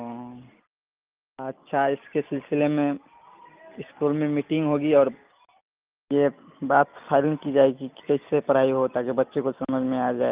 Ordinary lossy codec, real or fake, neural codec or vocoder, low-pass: Opus, 32 kbps; real; none; 3.6 kHz